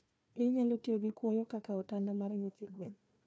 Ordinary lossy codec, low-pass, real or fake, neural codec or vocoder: none; none; fake; codec, 16 kHz, 1 kbps, FunCodec, trained on Chinese and English, 50 frames a second